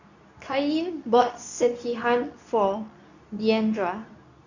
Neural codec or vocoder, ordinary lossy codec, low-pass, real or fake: codec, 24 kHz, 0.9 kbps, WavTokenizer, medium speech release version 2; none; 7.2 kHz; fake